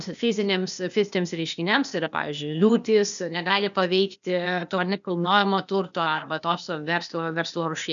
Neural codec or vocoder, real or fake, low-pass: codec, 16 kHz, 0.8 kbps, ZipCodec; fake; 7.2 kHz